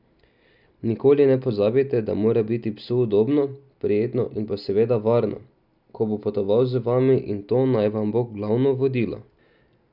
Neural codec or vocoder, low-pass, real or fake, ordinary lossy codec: none; 5.4 kHz; real; none